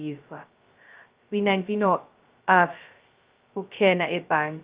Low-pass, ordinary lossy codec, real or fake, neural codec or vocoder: 3.6 kHz; Opus, 32 kbps; fake; codec, 16 kHz, 0.2 kbps, FocalCodec